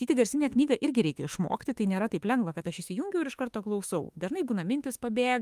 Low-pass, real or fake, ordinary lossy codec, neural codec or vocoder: 14.4 kHz; fake; Opus, 32 kbps; autoencoder, 48 kHz, 32 numbers a frame, DAC-VAE, trained on Japanese speech